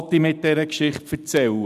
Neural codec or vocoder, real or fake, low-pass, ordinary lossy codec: none; real; 14.4 kHz; none